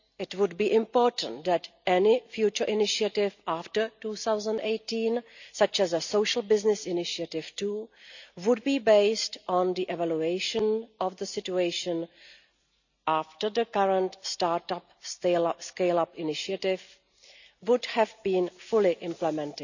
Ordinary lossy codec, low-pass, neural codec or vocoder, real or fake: none; 7.2 kHz; none; real